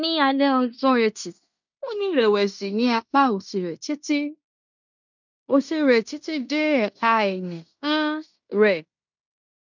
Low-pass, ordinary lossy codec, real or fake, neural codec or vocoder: 7.2 kHz; none; fake; codec, 16 kHz in and 24 kHz out, 0.9 kbps, LongCat-Audio-Codec, fine tuned four codebook decoder